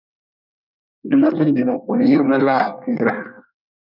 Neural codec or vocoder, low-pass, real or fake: codec, 24 kHz, 1 kbps, SNAC; 5.4 kHz; fake